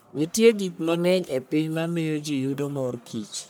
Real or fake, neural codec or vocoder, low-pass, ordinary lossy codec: fake; codec, 44.1 kHz, 1.7 kbps, Pupu-Codec; none; none